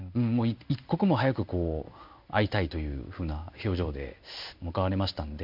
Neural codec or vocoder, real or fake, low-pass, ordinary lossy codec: codec, 16 kHz in and 24 kHz out, 1 kbps, XY-Tokenizer; fake; 5.4 kHz; MP3, 48 kbps